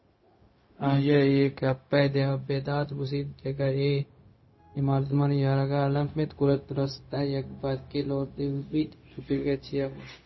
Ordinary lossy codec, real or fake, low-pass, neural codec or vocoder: MP3, 24 kbps; fake; 7.2 kHz; codec, 16 kHz, 0.4 kbps, LongCat-Audio-Codec